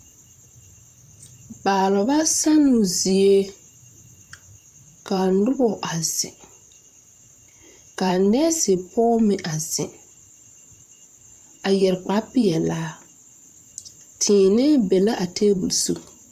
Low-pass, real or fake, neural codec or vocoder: 14.4 kHz; fake; vocoder, 44.1 kHz, 128 mel bands, Pupu-Vocoder